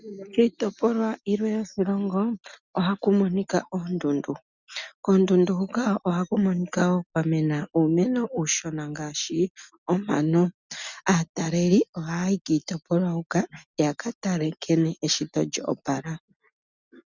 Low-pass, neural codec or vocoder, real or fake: 7.2 kHz; none; real